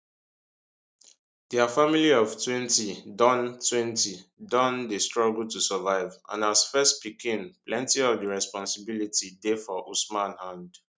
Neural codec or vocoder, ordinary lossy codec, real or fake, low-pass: none; none; real; none